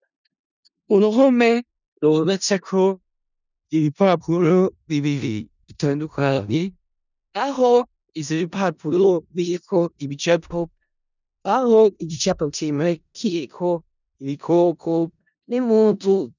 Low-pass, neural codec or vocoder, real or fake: 7.2 kHz; codec, 16 kHz in and 24 kHz out, 0.4 kbps, LongCat-Audio-Codec, four codebook decoder; fake